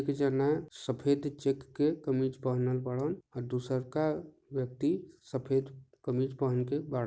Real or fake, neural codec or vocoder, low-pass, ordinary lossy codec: real; none; none; none